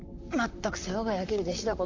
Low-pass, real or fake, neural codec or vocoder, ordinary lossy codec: 7.2 kHz; fake; vocoder, 44.1 kHz, 128 mel bands, Pupu-Vocoder; AAC, 48 kbps